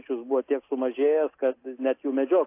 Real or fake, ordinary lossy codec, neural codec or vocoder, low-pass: real; AAC, 24 kbps; none; 3.6 kHz